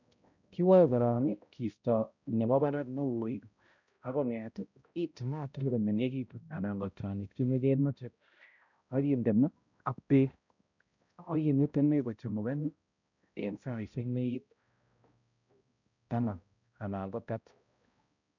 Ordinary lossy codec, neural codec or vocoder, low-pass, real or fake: none; codec, 16 kHz, 0.5 kbps, X-Codec, HuBERT features, trained on balanced general audio; 7.2 kHz; fake